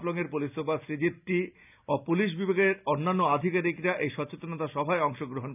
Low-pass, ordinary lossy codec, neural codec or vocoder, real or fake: 3.6 kHz; none; none; real